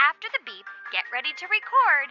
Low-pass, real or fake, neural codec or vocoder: 7.2 kHz; real; none